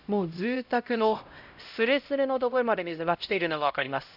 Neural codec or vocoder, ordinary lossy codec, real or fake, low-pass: codec, 16 kHz, 0.5 kbps, X-Codec, HuBERT features, trained on LibriSpeech; none; fake; 5.4 kHz